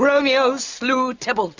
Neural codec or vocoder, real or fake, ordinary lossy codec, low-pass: none; real; Opus, 64 kbps; 7.2 kHz